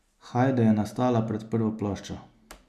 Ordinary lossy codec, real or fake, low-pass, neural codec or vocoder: none; real; 14.4 kHz; none